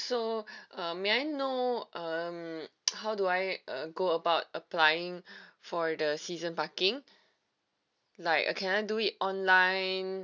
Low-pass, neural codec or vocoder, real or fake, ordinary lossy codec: 7.2 kHz; none; real; none